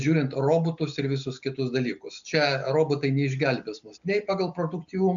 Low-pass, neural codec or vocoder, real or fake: 7.2 kHz; none; real